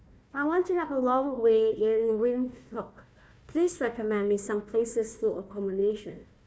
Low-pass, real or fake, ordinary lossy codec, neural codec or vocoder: none; fake; none; codec, 16 kHz, 1 kbps, FunCodec, trained on Chinese and English, 50 frames a second